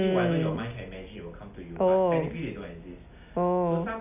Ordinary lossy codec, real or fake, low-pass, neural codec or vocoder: none; real; 3.6 kHz; none